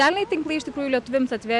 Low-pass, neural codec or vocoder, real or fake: 10.8 kHz; none; real